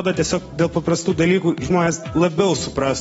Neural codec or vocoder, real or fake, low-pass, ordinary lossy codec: none; real; 19.8 kHz; AAC, 24 kbps